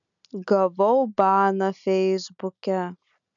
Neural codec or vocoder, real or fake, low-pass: none; real; 7.2 kHz